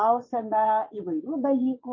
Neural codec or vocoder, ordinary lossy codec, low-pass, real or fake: vocoder, 22.05 kHz, 80 mel bands, Vocos; MP3, 32 kbps; 7.2 kHz; fake